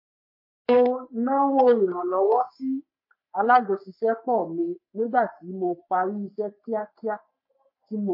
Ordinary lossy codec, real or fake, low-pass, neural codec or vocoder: MP3, 32 kbps; fake; 5.4 kHz; codec, 44.1 kHz, 3.4 kbps, Pupu-Codec